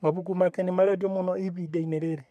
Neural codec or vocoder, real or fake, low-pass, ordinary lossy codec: codec, 44.1 kHz, 3.4 kbps, Pupu-Codec; fake; 14.4 kHz; none